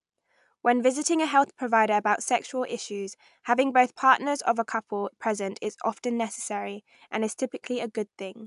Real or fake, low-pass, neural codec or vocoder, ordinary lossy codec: real; 10.8 kHz; none; AAC, 96 kbps